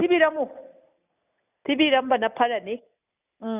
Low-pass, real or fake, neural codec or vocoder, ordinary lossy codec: 3.6 kHz; real; none; none